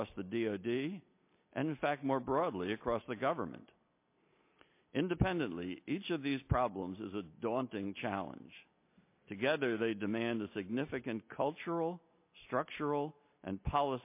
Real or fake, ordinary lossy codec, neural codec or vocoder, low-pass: real; MP3, 24 kbps; none; 3.6 kHz